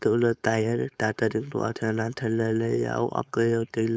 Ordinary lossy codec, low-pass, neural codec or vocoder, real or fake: none; none; codec, 16 kHz, 4.8 kbps, FACodec; fake